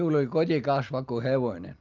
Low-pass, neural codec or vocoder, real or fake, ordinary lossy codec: 7.2 kHz; none; real; Opus, 32 kbps